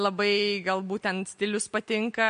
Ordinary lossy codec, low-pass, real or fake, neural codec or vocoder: MP3, 48 kbps; 9.9 kHz; real; none